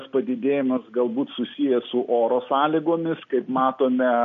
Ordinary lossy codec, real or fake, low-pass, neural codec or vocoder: MP3, 48 kbps; real; 7.2 kHz; none